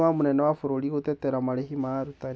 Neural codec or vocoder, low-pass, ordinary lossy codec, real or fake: none; none; none; real